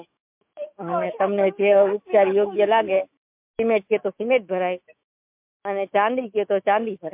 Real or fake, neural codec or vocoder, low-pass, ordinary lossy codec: fake; autoencoder, 48 kHz, 128 numbers a frame, DAC-VAE, trained on Japanese speech; 3.6 kHz; MP3, 32 kbps